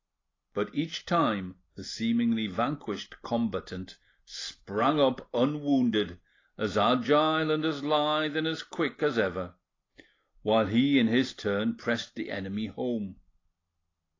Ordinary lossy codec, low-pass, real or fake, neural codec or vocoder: AAC, 32 kbps; 7.2 kHz; real; none